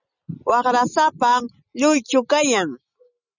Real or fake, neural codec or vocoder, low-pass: real; none; 7.2 kHz